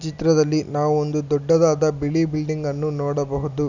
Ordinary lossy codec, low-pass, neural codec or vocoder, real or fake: none; 7.2 kHz; none; real